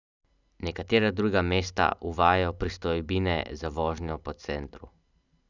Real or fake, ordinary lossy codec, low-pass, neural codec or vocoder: real; none; 7.2 kHz; none